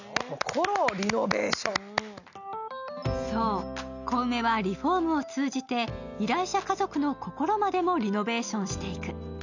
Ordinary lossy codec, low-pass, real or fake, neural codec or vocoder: none; 7.2 kHz; real; none